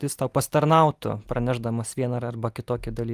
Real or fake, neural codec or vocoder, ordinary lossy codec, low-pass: real; none; Opus, 32 kbps; 14.4 kHz